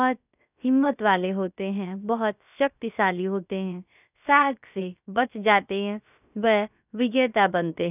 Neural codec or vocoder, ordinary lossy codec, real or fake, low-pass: codec, 16 kHz, about 1 kbps, DyCAST, with the encoder's durations; none; fake; 3.6 kHz